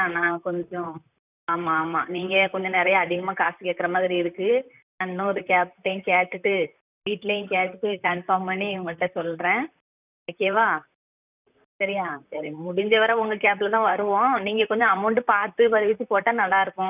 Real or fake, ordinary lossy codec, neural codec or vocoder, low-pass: fake; none; vocoder, 44.1 kHz, 128 mel bands, Pupu-Vocoder; 3.6 kHz